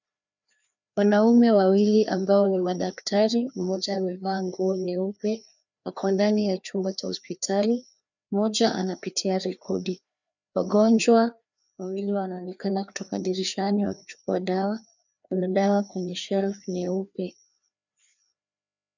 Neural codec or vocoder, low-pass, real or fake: codec, 16 kHz, 2 kbps, FreqCodec, larger model; 7.2 kHz; fake